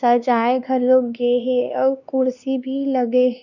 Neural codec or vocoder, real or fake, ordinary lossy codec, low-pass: codec, 16 kHz, 2 kbps, X-Codec, WavLM features, trained on Multilingual LibriSpeech; fake; none; 7.2 kHz